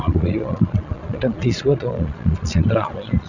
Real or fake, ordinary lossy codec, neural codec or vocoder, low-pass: fake; none; vocoder, 22.05 kHz, 80 mel bands, Vocos; 7.2 kHz